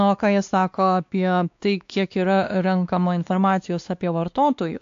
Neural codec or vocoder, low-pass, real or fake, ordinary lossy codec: codec, 16 kHz, 2 kbps, X-Codec, WavLM features, trained on Multilingual LibriSpeech; 7.2 kHz; fake; AAC, 64 kbps